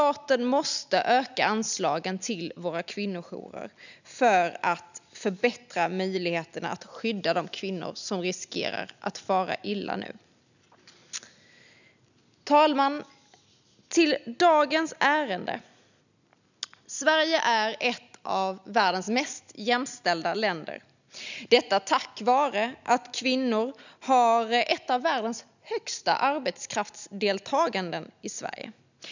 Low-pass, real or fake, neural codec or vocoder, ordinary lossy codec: 7.2 kHz; real; none; none